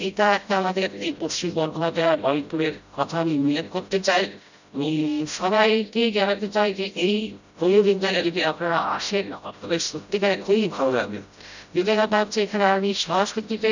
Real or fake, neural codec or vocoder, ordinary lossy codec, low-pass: fake; codec, 16 kHz, 0.5 kbps, FreqCodec, smaller model; none; 7.2 kHz